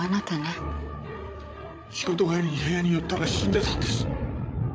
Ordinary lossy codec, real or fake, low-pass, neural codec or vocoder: none; fake; none; codec, 16 kHz, 8 kbps, FreqCodec, larger model